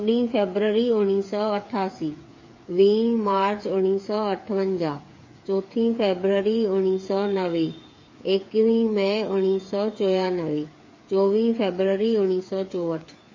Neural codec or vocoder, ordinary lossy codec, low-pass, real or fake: codec, 16 kHz, 8 kbps, FreqCodec, smaller model; MP3, 32 kbps; 7.2 kHz; fake